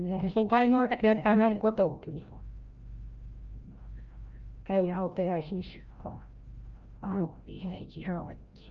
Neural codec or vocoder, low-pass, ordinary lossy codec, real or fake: codec, 16 kHz, 0.5 kbps, FreqCodec, larger model; 7.2 kHz; Opus, 32 kbps; fake